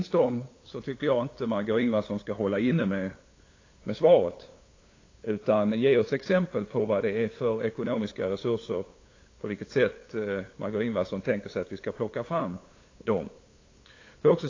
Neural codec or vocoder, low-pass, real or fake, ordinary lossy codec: codec, 16 kHz, 8 kbps, FunCodec, trained on LibriTTS, 25 frames a second; 7.2 kHz; fake; AAC, 32 kbps